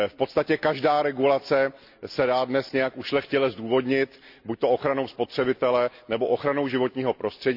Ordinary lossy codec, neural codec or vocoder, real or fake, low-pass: none; none; real; 5.4 kHz